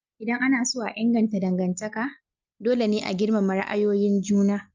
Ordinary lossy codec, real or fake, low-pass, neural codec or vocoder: Opus, 24 kbps; real; 7.2 kHz; none